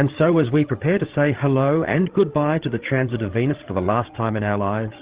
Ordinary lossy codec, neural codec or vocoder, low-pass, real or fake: Opus, 16 kbps; codec, 16 kHz, 16 kbps, FreqCodec, larger model; 3.6 kHz; fake